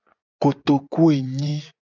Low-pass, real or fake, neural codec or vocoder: 7.2 kHz; fake; vocoder, 24 kHz, 100 mel bands, Vocos